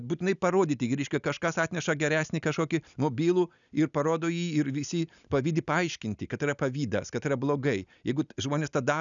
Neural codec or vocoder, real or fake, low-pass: none; real; 7.2 kHz